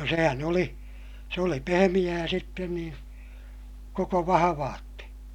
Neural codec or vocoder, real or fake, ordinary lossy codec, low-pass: none; real; none; 19.8 kHz